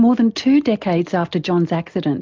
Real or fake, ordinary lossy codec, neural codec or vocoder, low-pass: real; Opus, 24 kbps; none; 7.2 kHz